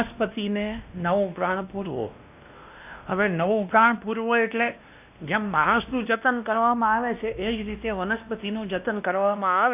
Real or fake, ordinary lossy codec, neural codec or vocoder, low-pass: fake; none; codec, 16 kHz, 1 kbps, X-Codec, WavLM features, trained on Multilingual LibriSpeech; 3.6 kHz